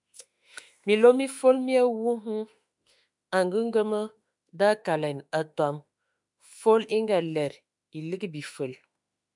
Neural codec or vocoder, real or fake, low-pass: autoencoder, 48 kHz, 32 numbers a frame, DAC-VAE, trained on Japanese speech; fake; 10.8 kHz